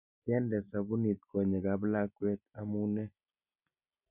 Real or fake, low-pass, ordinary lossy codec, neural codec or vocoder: real; 3.6 kHz; none; none